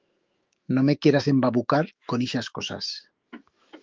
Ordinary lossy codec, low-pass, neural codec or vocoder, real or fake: Opus, 32 kbps; 7.2 kHz; autoencoder, 48 kHz, 128 numbers a frame, DAC-VAE, trained on Japanese speech; fake